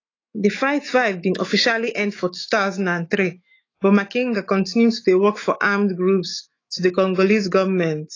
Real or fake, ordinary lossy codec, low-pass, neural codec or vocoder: fake; AAC, 32 kbps; 7.2 kHz; autoencoder, 48 kHz, 128 numbers a frame, DAC-VAE, trained on Japanese speech